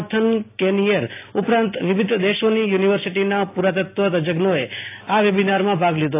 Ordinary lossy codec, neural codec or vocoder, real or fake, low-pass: AAC, 24 kbps; none; real; 3.6 kHz